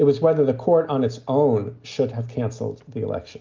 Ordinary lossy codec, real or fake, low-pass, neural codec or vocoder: Opus, 24 kbps; real; 7.2 kHz; none